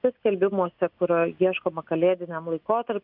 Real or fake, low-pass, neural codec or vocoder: real; 5.4 kHz; none